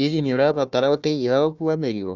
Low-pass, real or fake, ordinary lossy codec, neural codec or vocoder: 7.2 kHz; fake; none; codec, 16 kHz, 1 kbps, FunCodec, trained on Chinese and English, 50 frames a second